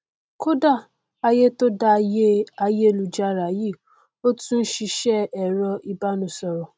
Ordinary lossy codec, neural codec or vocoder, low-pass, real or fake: none; none; none; real